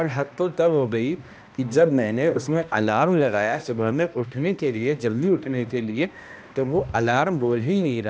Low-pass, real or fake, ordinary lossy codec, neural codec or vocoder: none; fake; none; codec, 16 kHz, 1 kbps, X-Codec, HuBERT features, trained on balanced general audio